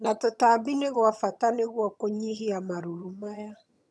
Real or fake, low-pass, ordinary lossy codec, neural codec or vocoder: fake; none; none; vocoder, 22.05 kHz, 80 mel bands, HiFi-GAN